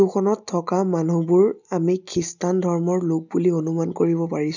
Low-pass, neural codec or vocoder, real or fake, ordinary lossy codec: 7.2 kHz; none; real; none